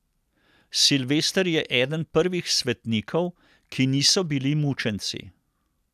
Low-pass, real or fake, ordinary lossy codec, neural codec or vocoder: 14.4 kHz; real; none; none